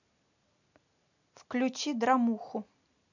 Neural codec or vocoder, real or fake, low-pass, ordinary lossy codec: none; real; 7.2 kHz; none